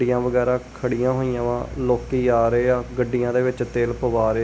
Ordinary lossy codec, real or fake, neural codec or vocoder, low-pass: none; real; none; none